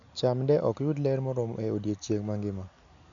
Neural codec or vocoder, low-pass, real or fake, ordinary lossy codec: none; 7.2 kHz; real; none